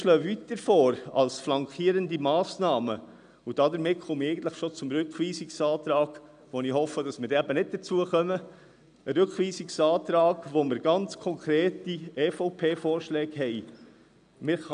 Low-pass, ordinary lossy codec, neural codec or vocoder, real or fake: 9.9 kHz; none; none; real